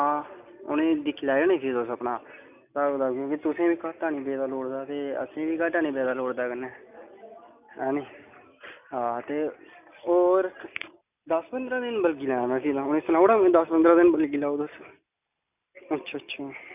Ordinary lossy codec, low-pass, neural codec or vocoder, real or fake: none; 3.6 kHz; none; real